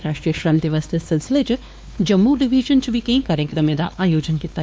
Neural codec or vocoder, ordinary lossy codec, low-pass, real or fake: codec, 16 kHz, 2 kbps, X-Codec, WavLM features, trained on Multilingual LibriSpeech; none; none; fake